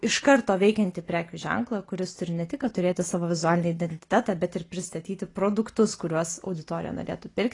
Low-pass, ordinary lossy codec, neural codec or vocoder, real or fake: 10.8 kHz; AAC, 32 kbps; none; real